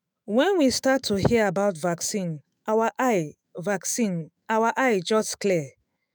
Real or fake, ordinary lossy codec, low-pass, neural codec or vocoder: fake; none; none; autoencoder, 48 kHz, 128 numbers a frame, DAC-VAE, trained on Japanese speech